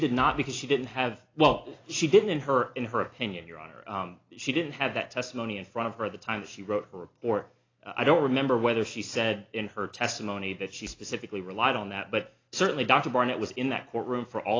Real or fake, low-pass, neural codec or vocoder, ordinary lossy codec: real; 7.2 kHz; none; AAC, 32 kbps